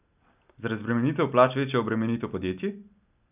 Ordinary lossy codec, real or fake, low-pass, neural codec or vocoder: none; real; 3.6 kHz; none